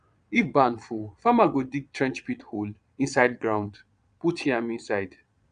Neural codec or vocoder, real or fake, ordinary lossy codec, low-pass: vocoder, 22.05 kHz, 80 mel bands, Vocos; fake; none; 9.9 kHz